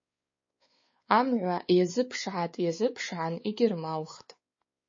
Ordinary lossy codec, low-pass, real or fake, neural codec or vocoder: MP3, 32 kbps; 7.2 kHz; fake; codec, 16 kHz, 4 kbps, X-Codec, WavLM features, trained on Multilingual LibriSpeech